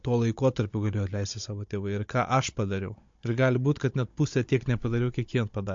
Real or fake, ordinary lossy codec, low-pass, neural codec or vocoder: fake; MP3, 48 kbps; 7.2 kHz; codec, 16 kHz, 16 kbps, FunCodec, trained on Chinese and English, 50 frames a second